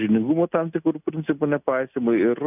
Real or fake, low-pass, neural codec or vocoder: real; 3.6 kHz; none